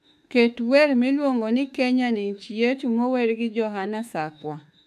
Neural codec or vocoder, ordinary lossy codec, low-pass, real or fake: autoencoder, 48 kHz, 32 numbers a frame, DAC-VAE, trained on Japanese speech; AAC, 96 kbps; 14.4 kHz; fake